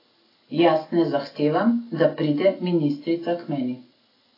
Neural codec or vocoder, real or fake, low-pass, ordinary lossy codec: none; real; 5.4 kHz; AAC, 24 kbps